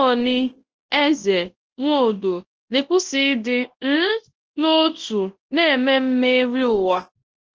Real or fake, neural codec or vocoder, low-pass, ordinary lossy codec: fake; codec, 24 kHz, 0.9 kbps, WavTokenizer, large speech release; 7.2 kHz; Opus, 16 kbps